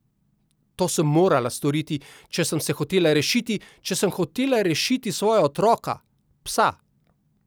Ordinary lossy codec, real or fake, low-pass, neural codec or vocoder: none; real; none; none